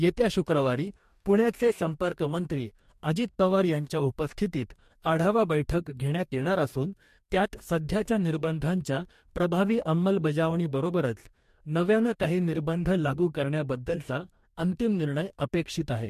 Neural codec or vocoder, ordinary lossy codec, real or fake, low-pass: codec, 44.1 kHz, 2.6 kbps, DAC; MP3, 64 kbps; fake; 14.4 kHz